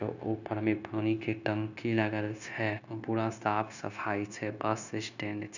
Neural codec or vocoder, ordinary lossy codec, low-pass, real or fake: codec, 16 kHz, 0.9 kbps, LongCat-Audio-Codec; none; 7.2 kHz; fake